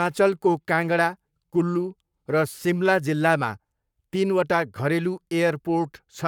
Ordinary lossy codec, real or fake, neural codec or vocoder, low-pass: none; fake; codec, 44.1 kHz, 7.8 kbps, Pupu-Codec; 19.8 kHz